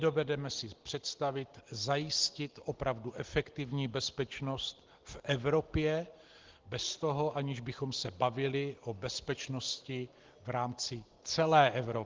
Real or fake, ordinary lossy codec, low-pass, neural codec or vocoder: real; Opus, 16 kbps; 7.2 kHz; none